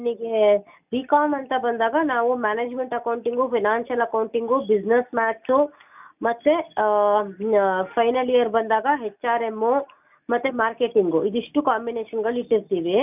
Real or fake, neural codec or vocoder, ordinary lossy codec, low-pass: real; none; none; 3.6 kHz